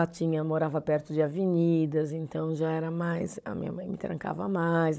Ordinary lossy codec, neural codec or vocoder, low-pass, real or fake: none; codec, 16 kHz, 16 kbps, FunCodec, trained on Chinese and English, 50 frames a second; none; fake